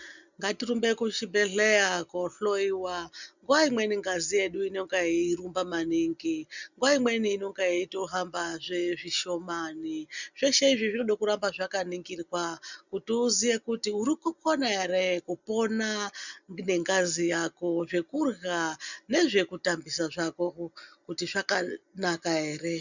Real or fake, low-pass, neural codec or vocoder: real; 7.2 kHz; none